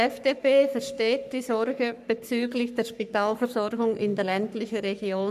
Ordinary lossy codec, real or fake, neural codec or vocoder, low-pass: none; fake; codec, 44.1 kHz, 3.4 kbps, Pupu-Codec; 14.4 kHz